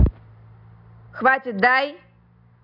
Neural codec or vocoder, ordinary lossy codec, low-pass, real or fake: none; none; 5.4 kHz; real